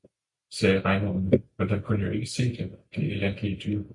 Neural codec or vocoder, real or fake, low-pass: none; real; 10.8 kHz